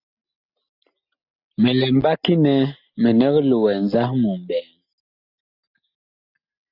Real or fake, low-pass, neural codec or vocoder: real; 5.4 kHz; none